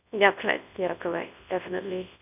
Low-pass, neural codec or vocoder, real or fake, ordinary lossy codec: 3.6 kHz; codec, 24 kHz, 0.9 kbps, WavTokenizer, large speech release; fake; none